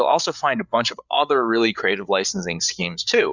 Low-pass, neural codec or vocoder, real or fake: 7.2 kHz; codec, 16 kHz, 8 kbps, FreqCodec, larger model; fake